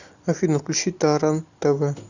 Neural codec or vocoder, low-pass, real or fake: none; 7.2 kHz; real